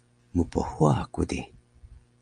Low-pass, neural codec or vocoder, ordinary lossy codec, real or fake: 9.9 kHz; none; Opus, 32 kbps; real